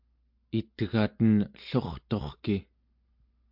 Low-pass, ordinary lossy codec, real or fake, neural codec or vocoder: 5.4 kHz; MP3, 48 kbps; real; none